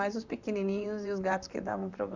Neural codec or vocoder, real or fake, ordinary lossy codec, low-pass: vocoder, 44.1 kHz, 128 mel bands, Pupu-Vocoder; fake; Opus, 64 kbps; 7.2 kHz